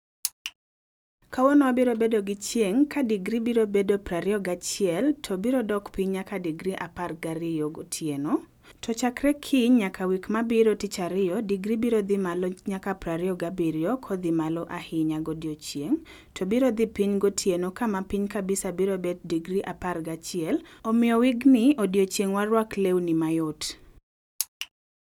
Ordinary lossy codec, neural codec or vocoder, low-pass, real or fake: none; none; 19.8 kHz; real